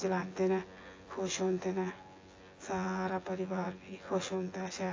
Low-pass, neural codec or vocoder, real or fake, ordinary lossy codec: 7.2 kHz; vocoder, 24 kHz, 100 mel bands, Vocos; fake; AAC, 32 kbps